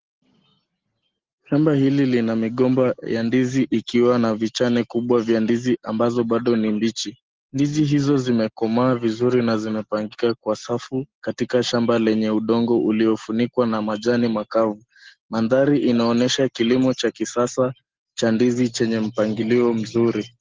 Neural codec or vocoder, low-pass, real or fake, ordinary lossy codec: none; 7.2 kHz; real; Opus, 16 kbps